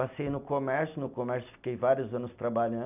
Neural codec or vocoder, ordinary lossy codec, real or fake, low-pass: none; none; real; 3.6 kHz